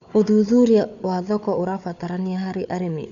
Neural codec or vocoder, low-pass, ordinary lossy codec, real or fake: codec, 16 kHz, 4 kbps, FunCodec, trained on Chinese and English, 50 frames a second; 7.2 kHz; none; fake